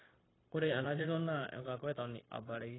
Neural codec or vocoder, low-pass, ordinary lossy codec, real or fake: codec, 16 kHz, 0.9 kbps, LongCat-Audio-Codec; 7.2 kHz; AAC, 16 kbps; fake